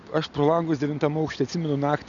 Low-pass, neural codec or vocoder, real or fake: 7.2 kHz; none; real